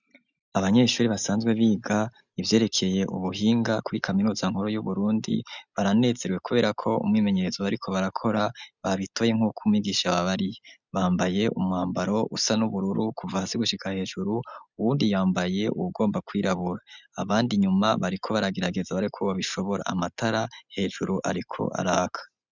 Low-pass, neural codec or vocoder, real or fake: 7.2 kHz; none; real